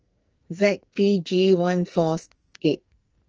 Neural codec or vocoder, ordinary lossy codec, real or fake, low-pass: codec, 44.1 kHz, 2.6 kbps, SNAC; Opus, 24 kbps; fake; 7.2 kHz